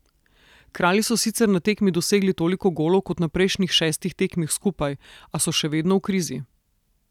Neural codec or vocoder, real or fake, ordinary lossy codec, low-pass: none; real; none; 19.8 kHz